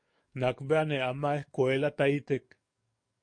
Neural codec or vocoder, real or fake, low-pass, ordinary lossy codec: codec, 44.1 kHz, 7.8 kbps, DAC; fake; 9.9 kHz; MP3, 48 kbps